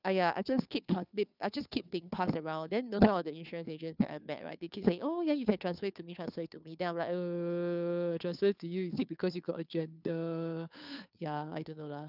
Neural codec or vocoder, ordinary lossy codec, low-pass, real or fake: codec, 16 kHz, 2 kbps, FunCodec, trained on Chinese and English, 25 frames a second; none; 5.4 kHz; fake